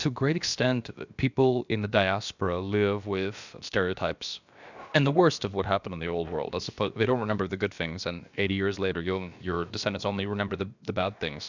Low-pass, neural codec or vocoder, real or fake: 7.2 kHz; codec, 16 kHz, about 1 kbps, DyCAST, with the encoder's durations; fake